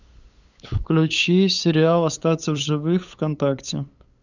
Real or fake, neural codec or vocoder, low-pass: fake; codec, 16 kHz, 8 kbps, FunCodec, trained on LibriTTS, 25 frames a second; 7.2 kHz